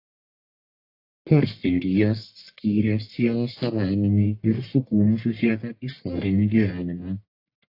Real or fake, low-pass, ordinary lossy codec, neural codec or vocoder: fake; 5.4 kHz; AAC, 32 kbps; codec, 44.1 kHz, 1.7 kbps, Pupu-Codec